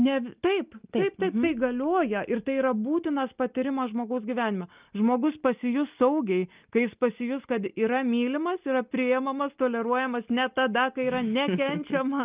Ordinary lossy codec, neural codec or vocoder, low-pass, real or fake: Opus, 24 kbps; none; 3.6 kHz; real